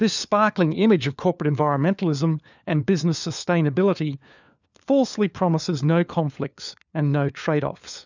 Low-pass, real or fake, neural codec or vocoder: 7.2 kHz; fake; codec, 16 kHz, 4 kbps, FunCodec, trained on LibriTTS, 50 frames a second